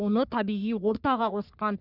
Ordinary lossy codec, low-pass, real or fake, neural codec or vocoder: none; 5.4 kHz; fake; codec, 44.1 kHz, 3.4 kbps, Pupu-Codec